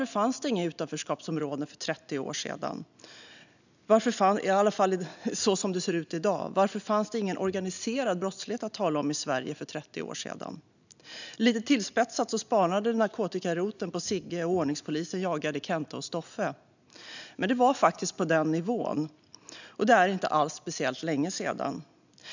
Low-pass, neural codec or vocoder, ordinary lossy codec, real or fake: 7.2 kHz; none; none; real